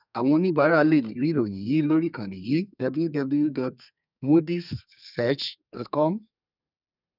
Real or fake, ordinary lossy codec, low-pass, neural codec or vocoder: fake; none; 5.4 kHz; codec, 32 kHz, 1.9 kbps, SNAC